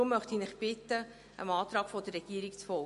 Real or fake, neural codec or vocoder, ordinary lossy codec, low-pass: real; none; MP3, 48 kbps; 14.4 kHz